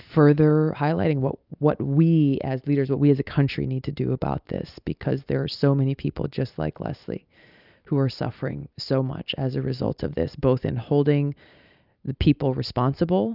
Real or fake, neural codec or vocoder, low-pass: real; none; 5.4 kHz